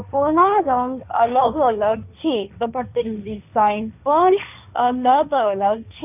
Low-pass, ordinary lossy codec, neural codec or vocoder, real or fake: 3.6 kHz; none; codec, 16 kHz, 1.1 kbps, Voila-Tokenizer; fake